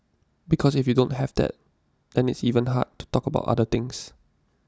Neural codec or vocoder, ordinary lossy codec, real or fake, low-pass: none; none; real; none